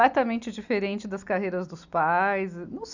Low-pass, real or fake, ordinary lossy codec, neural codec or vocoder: 7.2 kHz; real; none; none